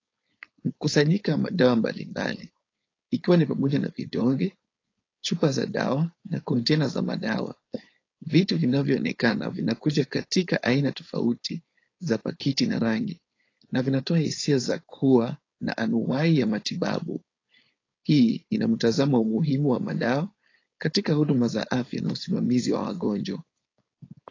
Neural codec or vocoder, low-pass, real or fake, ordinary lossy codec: codec, 16 kHz, 4.8 kbps, FACodec; 7.2 kHz; fake; AAC, 32 kbps